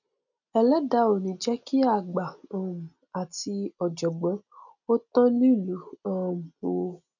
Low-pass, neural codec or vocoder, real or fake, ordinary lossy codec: 7.2 kHz; none; real; none